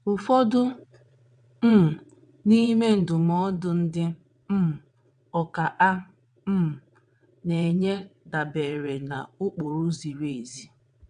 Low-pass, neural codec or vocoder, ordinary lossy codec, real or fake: 9.9 kHz; vocoder, 22.05 kHz, 80 mel bands, WaveNeXt; none; fake